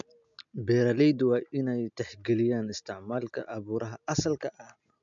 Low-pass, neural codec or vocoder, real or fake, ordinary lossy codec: 7.2 kHz; none; real; MP3, 64 kbps